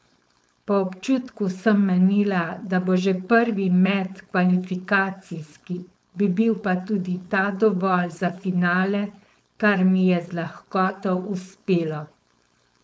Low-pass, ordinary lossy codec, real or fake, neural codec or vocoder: none; none; fake; codec, 16 kHz, 4.8 kbps, FACodec